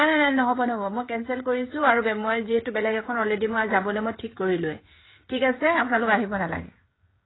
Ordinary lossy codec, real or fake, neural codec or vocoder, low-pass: AAC, 16 kbps; fake; codec, 16 kHz, 16 kbps, FreqCodec, smaller model; 7.2 kHz